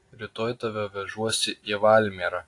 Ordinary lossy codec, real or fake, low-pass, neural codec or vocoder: AAC, 48 kbps; real; 10.8 kHz; none